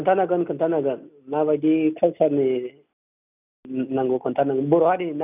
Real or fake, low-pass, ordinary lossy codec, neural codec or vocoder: real; 3.6 kHz; none; none